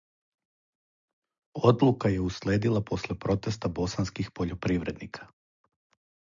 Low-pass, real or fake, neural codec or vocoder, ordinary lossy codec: 7.2 kHz; real; none; MP3, 64 kbps